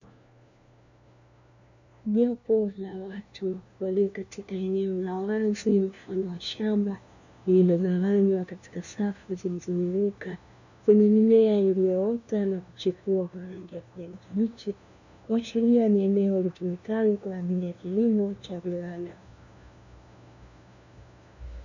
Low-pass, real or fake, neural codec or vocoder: 7.2 kHz; fake; codec, 16 kHz, 1 kbps, FunCodec, trained on LibriTTS, 50 frames a second